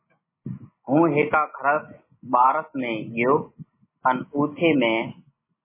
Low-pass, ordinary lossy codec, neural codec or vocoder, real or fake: 3.6 kHz; MP3, 16 kbps; none; real